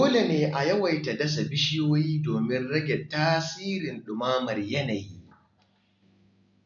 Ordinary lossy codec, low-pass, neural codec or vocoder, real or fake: none; 7.2 kHz; none; real